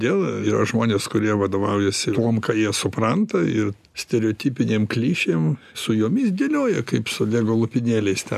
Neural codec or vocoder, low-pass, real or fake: none; 14.4 kHz; real